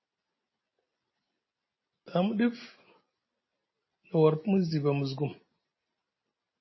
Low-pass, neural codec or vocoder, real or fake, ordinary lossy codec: 7.2 kHz; none; real; MP3, 24 kbps